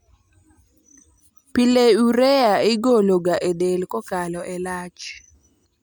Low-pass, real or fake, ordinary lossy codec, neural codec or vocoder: none; real; none; none